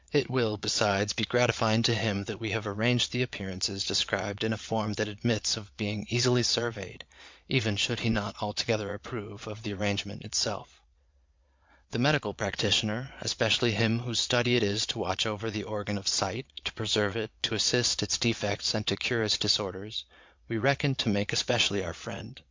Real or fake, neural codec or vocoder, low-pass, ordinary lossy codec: fake; vocoder, 22.05 kHz, 80 mel bands, Vocos; 7.2 kHz; MP3, 64 kbps